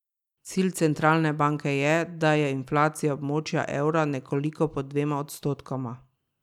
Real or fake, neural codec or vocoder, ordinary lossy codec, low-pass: real; none; none; 19.8 kHz